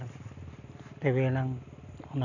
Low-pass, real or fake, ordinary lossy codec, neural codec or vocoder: 7.2 kHz; real; none; none